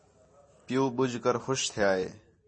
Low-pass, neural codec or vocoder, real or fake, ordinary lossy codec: 9.9 kHz; none; real; MP3, 32 kbps